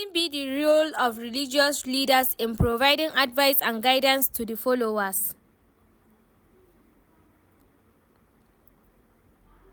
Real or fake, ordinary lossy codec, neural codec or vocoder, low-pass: real; none; none; none